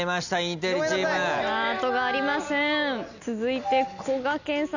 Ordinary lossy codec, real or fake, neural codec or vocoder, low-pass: none; real; none; 7.2 kHz